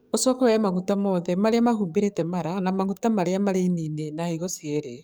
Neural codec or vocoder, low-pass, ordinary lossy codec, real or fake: codec, 44.1 kHz, 7.8 kbps, DAC; none; none; fake